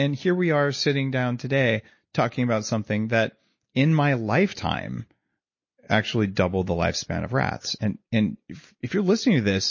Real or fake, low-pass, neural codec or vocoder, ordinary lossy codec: real; 7.2 kHz; none; MP3, 32 kbps